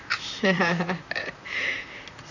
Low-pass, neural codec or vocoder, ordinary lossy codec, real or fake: 7.2 kHz; vocoder, 44.1 kHz, 128 mel bands, Pupu-Vocoder; none; fake